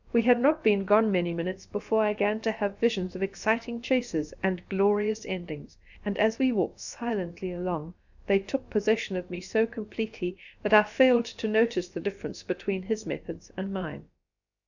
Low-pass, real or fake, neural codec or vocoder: 7.2 kHz; fake; codec, 16 kHz, about 1 kbps, DyCAST, with the encoder's durations